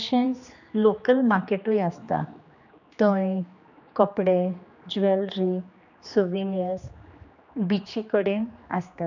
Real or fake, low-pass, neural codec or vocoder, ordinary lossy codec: fake; 7.2 kHz; codec, 16 kHz, 2 kbps, X-Codec, HuBERT features, trained on general audio; none